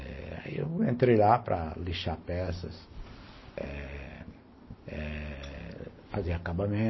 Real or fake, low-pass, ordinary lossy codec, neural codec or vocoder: real; 7.2 kHz; MP3, 24 kbps; none